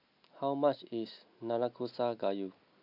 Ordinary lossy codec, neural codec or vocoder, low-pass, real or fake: none; none; 5.4 kHz; real